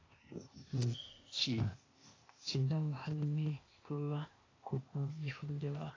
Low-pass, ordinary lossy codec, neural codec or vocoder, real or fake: 7.2 kHz; AAC, 32 kbps; codec, 16 kHz, 0.8 kbps, ZipCodec; fake